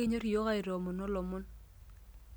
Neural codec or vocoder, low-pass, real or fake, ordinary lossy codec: none; none; real; none